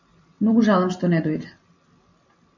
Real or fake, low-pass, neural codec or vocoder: real; 7.2 kHz; none